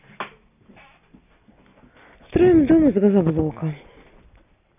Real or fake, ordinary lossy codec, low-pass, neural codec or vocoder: real; none; 3.6 kHz; none